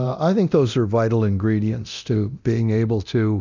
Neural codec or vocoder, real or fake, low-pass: codec, 24 kHz, 0.9 kbps, DualCodec; fake; 7.2 kHz